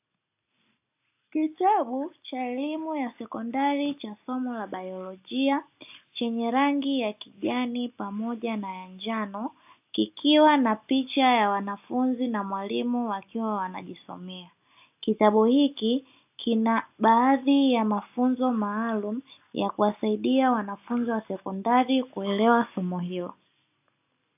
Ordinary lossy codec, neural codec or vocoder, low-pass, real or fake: AAC, 32 kbps; none; 3.6 kHz; real